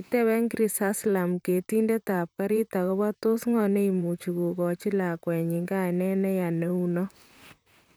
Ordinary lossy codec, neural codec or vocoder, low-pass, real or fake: none; vocoder, 44.1 kHz, 128 mel bands, Pupu-Vocoder; none; fake